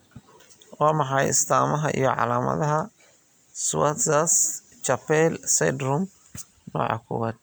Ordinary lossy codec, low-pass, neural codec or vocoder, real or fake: none; none; none; real